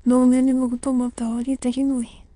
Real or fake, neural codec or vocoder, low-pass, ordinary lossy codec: fake; autoencoder, 22.05 kHz, a latent of 192 numbers a frame, VITS, trained on many speakers; 9.9 kHz; Opus, 64 kbps